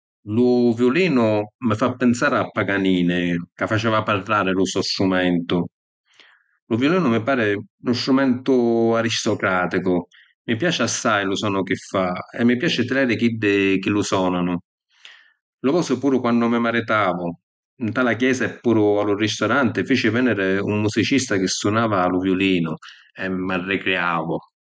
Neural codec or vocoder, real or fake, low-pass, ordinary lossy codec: none; real; none; none